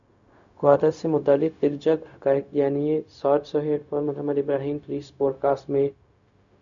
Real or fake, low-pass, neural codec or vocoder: fake; 7.2 kHz; codec, 16 kHz, 0.4 kbps, LongCat-Audio-Codec